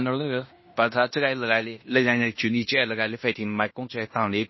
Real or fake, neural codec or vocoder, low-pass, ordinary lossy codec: fake; codec, 16 kHz in and 24 kHz out, 0.9 kbps, LongCat-Audio-Codec, fine tuned four codebook decoder; 7.2 kHz; MP3, 24 kbps